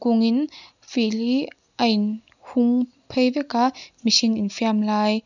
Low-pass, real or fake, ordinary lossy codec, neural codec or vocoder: 7.2 kHz; real; none; none